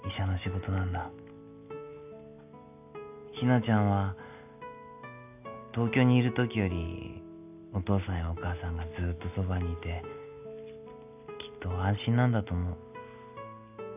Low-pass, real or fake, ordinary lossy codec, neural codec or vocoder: 3.6 kHz; real; none; none